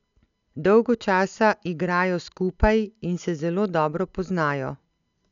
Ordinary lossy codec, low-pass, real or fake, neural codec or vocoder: none; 7.2 kHz; real; none